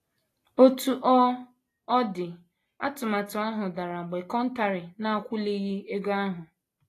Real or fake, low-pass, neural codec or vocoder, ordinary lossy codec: real; 14.4 kHz; none; AAC, 48 kbps